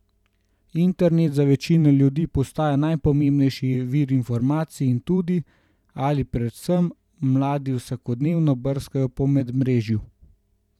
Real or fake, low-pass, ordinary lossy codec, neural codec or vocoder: fake; 19.8 kHz; none; vocoder, 44.1 kHz, 128 mel bands every 512 samples, BigVGAN v2